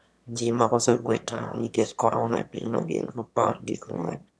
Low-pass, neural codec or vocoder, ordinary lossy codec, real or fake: none; autoencoder, 22.05 kHz, a latent of 192 numbers a frame, VITS, trained on one speaker; none; fake